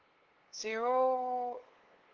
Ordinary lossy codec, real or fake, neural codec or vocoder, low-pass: Opus, 16 kbps; fake; codec, 16 kHz, 8 kbps, FunCodec, trained on LibriTTS, 25 frames a second; 7.2 kHz